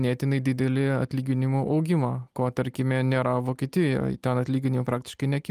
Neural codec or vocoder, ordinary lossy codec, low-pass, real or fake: none; Opus, 32 kbps; 14.4 kHz; real